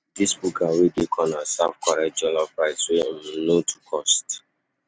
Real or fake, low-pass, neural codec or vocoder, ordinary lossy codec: real; none; none; none